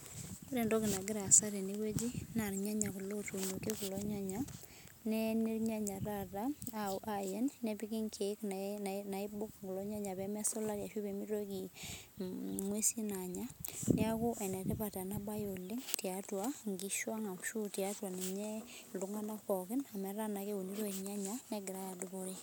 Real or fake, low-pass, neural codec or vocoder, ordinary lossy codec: real; none; none; none